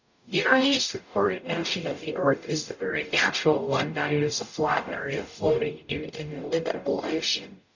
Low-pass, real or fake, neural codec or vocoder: 7.2 kHz; fake; codec, 44.1 kHz, 0.9 kbps, DAC